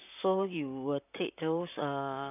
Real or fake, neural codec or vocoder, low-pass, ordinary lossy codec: fake; vocoder, 44.1 kHz, 128 mel bands, Pupu-Vocoder; 3.6 kHz; none